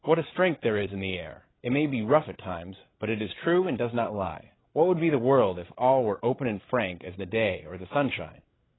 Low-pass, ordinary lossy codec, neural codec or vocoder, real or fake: 7.2 kHz; AAC, 16 kbps; codec, 16 kHz, 16 kbps, FreqCodec, larger model; fake